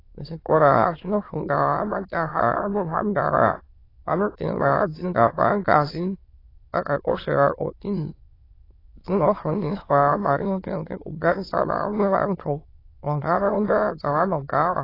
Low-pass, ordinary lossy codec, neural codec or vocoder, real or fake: 5.4 kHz; AAC, 24 kbps; autoencoder, 22.05 kHz, a latent of 192 numbers a frame, VITS, trained on many speakers; fake